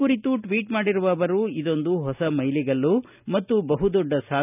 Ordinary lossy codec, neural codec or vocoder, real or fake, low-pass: none; none; real; 3.6 kHz